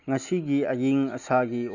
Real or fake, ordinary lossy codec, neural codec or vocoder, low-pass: real; none; none; 7.2 kHz